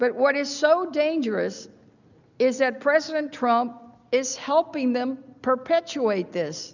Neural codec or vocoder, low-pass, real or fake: none; 7.2 kHz; real